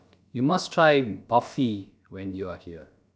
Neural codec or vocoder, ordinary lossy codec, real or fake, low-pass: codec, 16 kHz, about 1 kbps, DyCAST, with the encoder's durations; none; fake; none